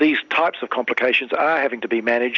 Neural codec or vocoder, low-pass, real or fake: none; 7.2 kHz; real